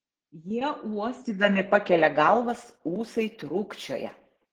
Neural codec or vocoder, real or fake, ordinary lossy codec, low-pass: none; real; Opus, 16 kbps; 19.8 kHz